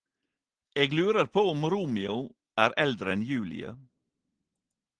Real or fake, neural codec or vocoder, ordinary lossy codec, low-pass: real; none; Opus, 16 kbps; 9.9 kHz